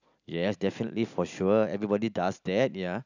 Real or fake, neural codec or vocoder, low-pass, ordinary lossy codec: real; none; 7.2 kHz; none